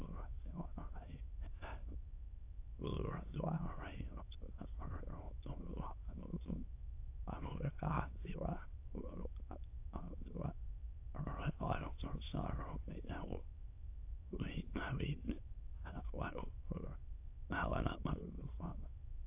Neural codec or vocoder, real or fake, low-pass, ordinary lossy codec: autoencoder, 22.05 kHz, a latent of 192 numbers a frame, VITS, trained on many speakers; fake; 3.6 kHz; AAC, 32 kbps